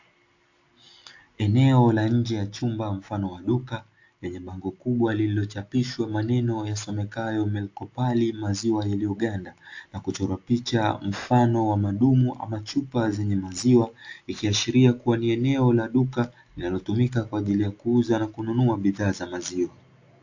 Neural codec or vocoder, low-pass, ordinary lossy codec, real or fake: none; 7.2 kHz; AAC, 48 kbps; real